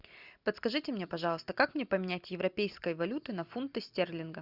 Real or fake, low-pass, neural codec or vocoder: real; 5.4 kHz; none